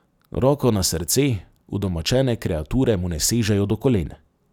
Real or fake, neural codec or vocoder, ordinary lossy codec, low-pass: real; none; none; 19.8 kHz